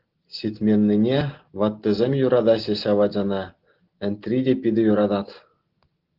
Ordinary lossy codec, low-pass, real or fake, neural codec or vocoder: Opus, 16 kbps; 5.4 kHz; real; none